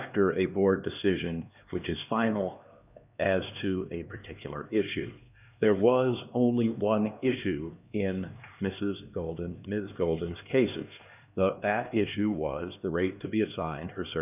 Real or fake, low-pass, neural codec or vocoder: fake; 3.6 kHz; codec, 16 kHz, 2 kbps, X-Codec, HuBERT features, trained on LibriSpeech